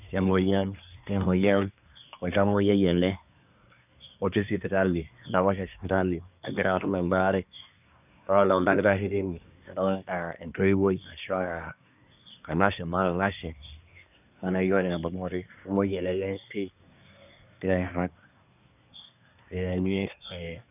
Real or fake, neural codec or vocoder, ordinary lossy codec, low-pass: fake; codec, 24 kHz, 1 kbps, SNAC; none; 3.6 kHz